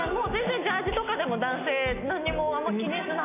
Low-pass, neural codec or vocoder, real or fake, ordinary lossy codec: 3.6 kHz; none; real; none